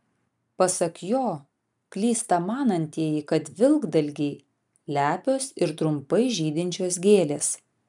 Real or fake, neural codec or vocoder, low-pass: real; none; 10.8 kHz